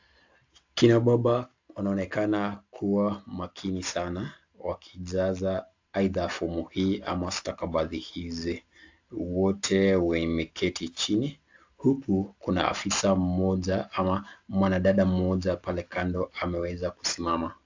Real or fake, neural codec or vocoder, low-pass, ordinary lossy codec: real; none; 7.2 kHz; AAC, 48 kbps